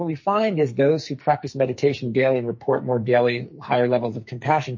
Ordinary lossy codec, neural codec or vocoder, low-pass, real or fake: MP3, 32 kbps; codec, 44.1 kHz, 2.6 kbps, SNAC; 7.2 kHz; fake